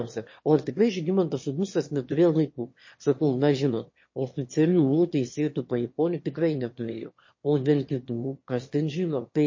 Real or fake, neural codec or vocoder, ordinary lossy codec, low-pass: fake; autoencoder, 22.05 kHz, a latent of 192 numbers a frame, VITS, trained on one speaker; MP3, 32 kbps; 7.2 kHz